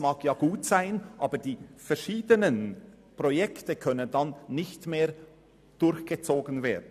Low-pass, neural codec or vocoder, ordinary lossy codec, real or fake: 14.4 kHz; none; none; real